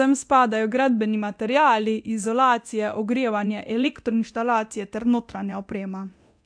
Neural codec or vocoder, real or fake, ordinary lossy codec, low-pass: codec, 24 kHz, 0.9 kbps, DualCodec; fake; AAC, 64 kbps; 9.9 kHz